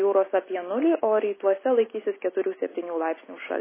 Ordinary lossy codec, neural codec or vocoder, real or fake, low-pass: MP3, 16 kbps; none; real; 3.6 kHz